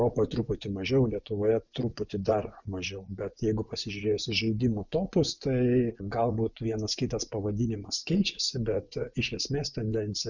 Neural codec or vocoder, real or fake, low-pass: vocoder, 24 kHz, 100 mel bands, Vocos; fake; 7.2 kHz